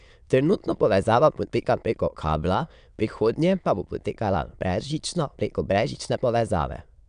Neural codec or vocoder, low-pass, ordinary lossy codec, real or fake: autoencoder, 22.05 kHz, a latent of 192 numbers a frame, VITS, trained on many speakers; 9.9 kHz; none; fake